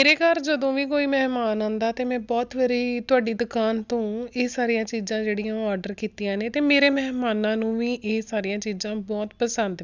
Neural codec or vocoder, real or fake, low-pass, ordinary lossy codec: none; real; 7.2 kHz; none